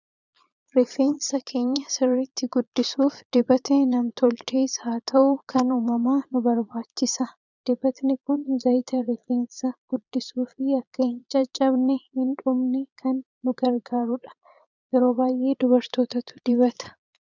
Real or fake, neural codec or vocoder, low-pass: fake; vocoder, 22.05 kHz, 80 mel bands, Vocos; 7.2 kHz